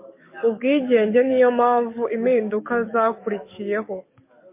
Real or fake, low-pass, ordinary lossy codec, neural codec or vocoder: fake; 3.6 kHz; MP3, 24 kbps; codec, 44.1 kHz, 7.8 kbps, DAC